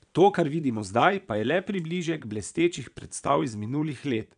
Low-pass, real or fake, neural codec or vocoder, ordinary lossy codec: 9.9 kHz; fake; vocoder, 22.05 kHz, 80 mel bands, Vocos; none